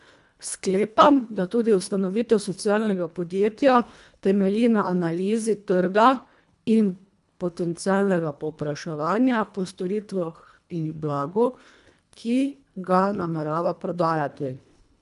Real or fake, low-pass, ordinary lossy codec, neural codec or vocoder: fake; 10.8 kHz; none; codec, 24 kHz, 1.5 kbps, HILCodec